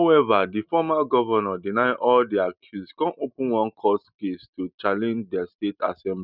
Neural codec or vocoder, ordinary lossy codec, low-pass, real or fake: none; none; 5.4 kHz; real